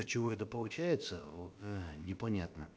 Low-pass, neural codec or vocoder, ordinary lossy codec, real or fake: none; codec, 16 kHz, about 1 kbps, DyCAST, with the encoder's durations; none; fake